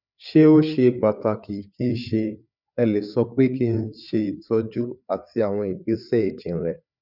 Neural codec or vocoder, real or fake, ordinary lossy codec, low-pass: codec, 16 kHz, 4 kbps, FreqCodec, larger model; fake; none; 5.4 kHz